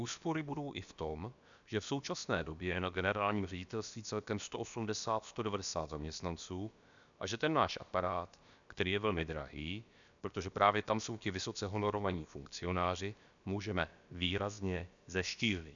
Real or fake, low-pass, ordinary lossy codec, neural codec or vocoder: fake; 7.2 kHz; MP3, 96 kbps; codec, 16 kHz, about 1 kbps, DyCAST, with the encoder's durations